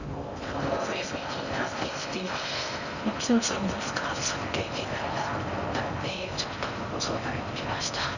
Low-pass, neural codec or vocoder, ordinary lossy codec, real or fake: 7.2 kHz; codec, 16 kHz in and 24 kHz out, 0.6 kbps, FocalCodec, streaming, 4096 codes; none; fake